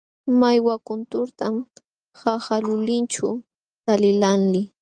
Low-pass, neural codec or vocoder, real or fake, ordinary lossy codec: 9.9 kHz; none; real; Opus, 32 kbps